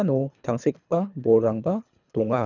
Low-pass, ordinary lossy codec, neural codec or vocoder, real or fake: 7.2 kHz; none; codec, 24 kHz, 3 kbps, HILCodec; fake